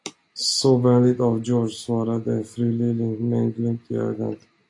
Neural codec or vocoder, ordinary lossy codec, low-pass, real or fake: none; AAC, 48 kbps; 10.8 kHz; real